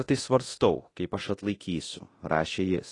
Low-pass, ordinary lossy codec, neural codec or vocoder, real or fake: 10.8 kHz; AAC, 32 kbps; codec, 24 kHz, 0.9 kbps, DualCodec; fake